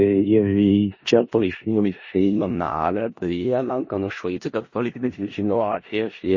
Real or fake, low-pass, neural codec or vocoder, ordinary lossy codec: fake; 7.2 kHz; codec, 16 kHz in and 24 kHz out, 0.4 kbps, LongCat-Audio-Codec, four codebook decoder; MP3, 32 kbps